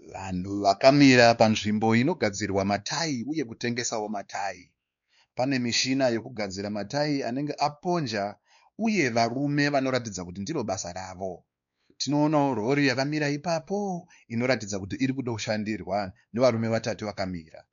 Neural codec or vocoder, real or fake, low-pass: codec, 16 kHz, 2 kbps, X-Codec, WavLM features, trained on Multilingual LibriSpeech; fake; 7.2 kHz